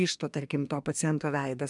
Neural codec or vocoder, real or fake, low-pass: codec, 24 kHz, 1 kbps, SNAC; fake; 10.8 kHz